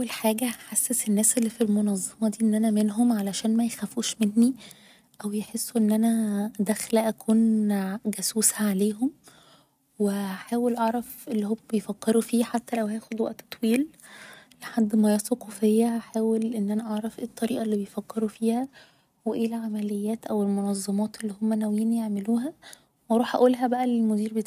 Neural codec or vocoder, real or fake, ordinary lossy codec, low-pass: none; real; none; 14.4 kHz